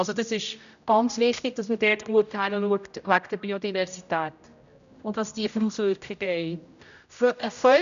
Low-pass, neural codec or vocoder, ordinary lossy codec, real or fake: 7.2 kHz; codec, 16 kHz, 0.5 kbps, X-Codec, HuBERT features, trained on general audio; none; fake